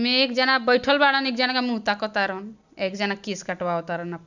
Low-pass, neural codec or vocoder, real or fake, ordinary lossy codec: 7.2 kHz; none; real; none